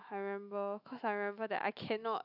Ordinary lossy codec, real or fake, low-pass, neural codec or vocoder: none; fake; 5.4 kHz; autoencoder, 48 kHz, 128 numbers a frame, DAC-VAE, trained on Japanese speech